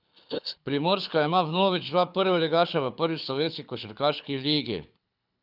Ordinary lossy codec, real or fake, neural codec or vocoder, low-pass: none; fake; codec, 24 kHz, 6 kbps, HILCodec; 5.4 kHz